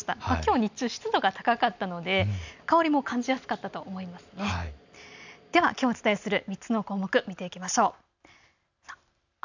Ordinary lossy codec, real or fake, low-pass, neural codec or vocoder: none; real; 7.2 kHz; none